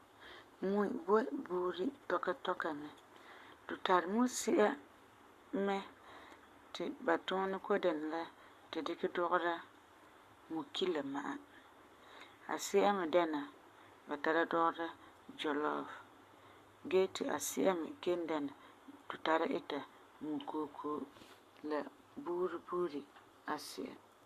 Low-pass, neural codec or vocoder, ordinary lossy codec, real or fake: 14.4 kHz; codec, 44.1 kHz, 7.8 kbps, Pupu-Codec; Opus, 64 kbps; fake